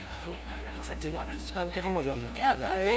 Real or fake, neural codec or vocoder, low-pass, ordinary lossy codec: fake; codec, 16 kHz, 0.5 kbps, FunCodec, trained on LibriTTS, 25 frames a second; none; none